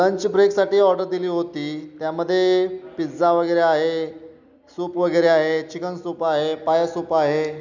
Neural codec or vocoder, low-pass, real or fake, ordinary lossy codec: none; 7.2 kHz; real; none